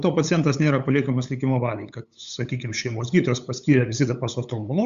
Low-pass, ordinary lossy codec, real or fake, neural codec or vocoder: 7.2 kHz; Opus, 64 kbps; fake; codec, 16 kHz, 8 kbps, FunCodec, trained on LibriTTS, 25 frames a second